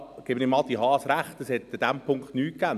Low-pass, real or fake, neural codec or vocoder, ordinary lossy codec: 14.4 kHz; real; none; none